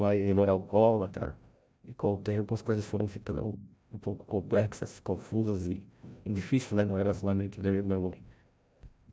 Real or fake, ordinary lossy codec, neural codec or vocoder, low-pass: fake; none; codec, 16 kHz, 0.5 kbps, FreqCodec, larger model; none